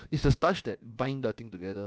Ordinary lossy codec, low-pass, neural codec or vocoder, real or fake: none; none; codec, 16 kHz, about 1 kbps, DyCAST, with the encoder's durations; fake